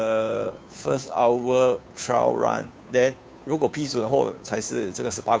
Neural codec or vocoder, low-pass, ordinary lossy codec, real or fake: codec, 16 kHz, 2 kbps, FunCodec, trained on Chinese and English, 25 frames a second; none; none; fake